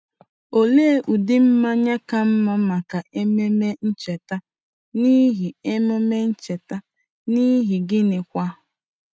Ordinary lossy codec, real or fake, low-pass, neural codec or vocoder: none; real; none; none